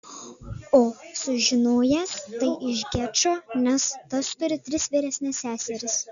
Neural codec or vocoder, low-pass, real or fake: none; 7.2 kHz; real